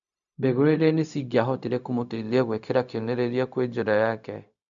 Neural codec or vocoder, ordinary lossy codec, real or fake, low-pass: codec, 16 kHz, 0.4 kbps, LongCat-Audio-Codec; MP3, 96 kbps; fake; 7.2 kHz